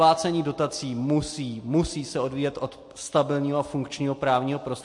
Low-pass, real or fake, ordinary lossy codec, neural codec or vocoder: 10.8 kHz; real; MP3, 48 kbps; none